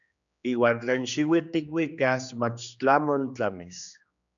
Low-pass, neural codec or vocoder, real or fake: 7.2 kHz; codec, 16 kHz, 2 kbps, X-Codec, HuBERT features, trained on general audio; fake